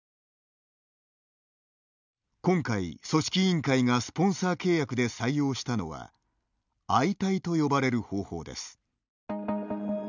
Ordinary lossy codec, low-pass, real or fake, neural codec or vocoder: none; 7.2 kHz; real; none